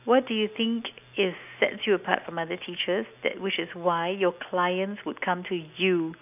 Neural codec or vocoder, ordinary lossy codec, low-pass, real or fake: none; none; 3.6 kHz; real